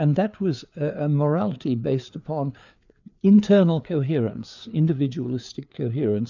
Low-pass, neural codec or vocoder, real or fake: 7.2 kHz; codec, 16 kHz, 4 kbps, FreqCodec, larger model; fake